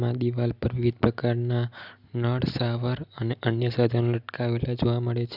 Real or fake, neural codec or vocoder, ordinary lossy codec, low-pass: real; none; none; 5.4 kHz